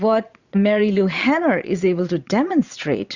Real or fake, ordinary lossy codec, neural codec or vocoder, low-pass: fake; Opus, 64 kbps; vocoder, 22.05 kHz, 80 mel bands, Vocos; 7.2 kHz